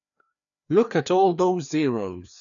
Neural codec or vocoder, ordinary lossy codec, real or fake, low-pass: codec, 16 kHz, 2 kbps, FreqCodec, larger model; none; fake; 7.2 kHz